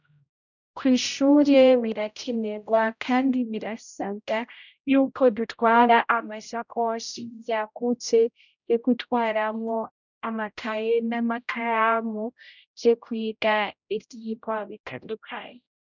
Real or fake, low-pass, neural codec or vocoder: fake; 7.2 kHz; codec, 16 kHz, 0.5 kbps, X-Codec, HuBERT features, trained on general audio